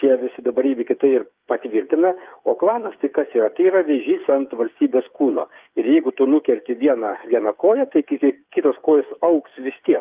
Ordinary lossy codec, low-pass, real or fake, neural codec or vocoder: Opus, 24 kbps; 3.6 kHz; fake; codec, 16 kHz, 8 kbps, FreqCodec, smaller model